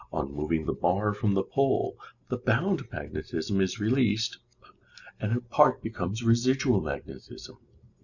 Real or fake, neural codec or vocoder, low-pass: real; none; 7.2 kHz